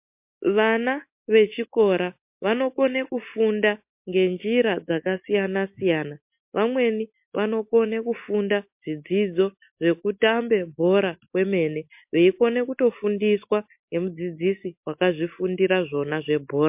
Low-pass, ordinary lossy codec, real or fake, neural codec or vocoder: 3.6 kHz; MP3, 32 kbps; real; none